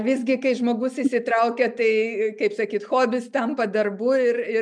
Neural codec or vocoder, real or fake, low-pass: none; real; 9.9 kHz